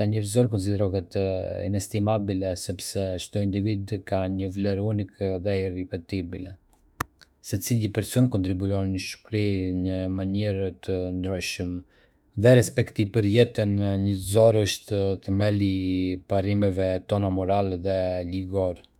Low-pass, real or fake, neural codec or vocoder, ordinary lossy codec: 19.8 kHz; fake; autoencoder, 48 kHz, 32 numbers a frame, DAC-VAE, trained on Japanese speech; none